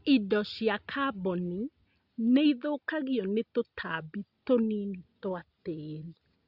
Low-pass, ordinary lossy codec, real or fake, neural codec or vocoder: 5.4 kHz; Opus, 64 kbps; real; none